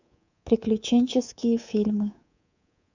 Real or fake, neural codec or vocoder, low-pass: fake; codec, 24 kHz, 3.1 kbps, DualCodec; 7.2 kHz